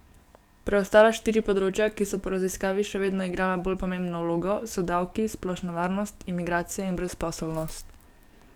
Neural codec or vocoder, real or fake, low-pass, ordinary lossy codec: codec, 44.1 kHz, 7.8 kbps, DAC; fake; 19.8 kHz; none